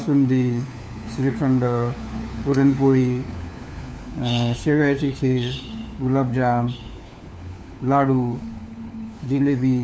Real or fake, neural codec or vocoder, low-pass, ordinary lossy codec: fake; codec, 16 kHz, 4 kbps, FunCodec, trained on LibriTTS, 50 frames a second; none; none